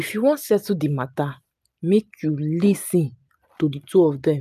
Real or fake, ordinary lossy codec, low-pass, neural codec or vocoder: real; none; 14.4 kHz; none